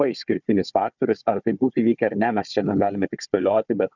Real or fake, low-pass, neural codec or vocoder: fake; 7.2 kHz; codec, 16 kHz, 4 kbps, FunCodec, trained on Chinese and English, 50 frames a second